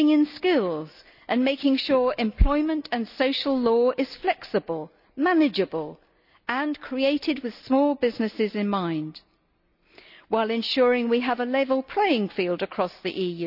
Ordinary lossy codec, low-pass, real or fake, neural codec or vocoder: none; 5.4 kHz; real; none